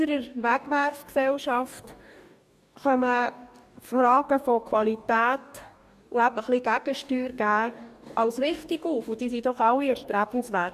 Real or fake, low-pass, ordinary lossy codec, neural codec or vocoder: fake; 14.4 kHz; none; codec, 44.1 kHz, 2.6 kbps, DAC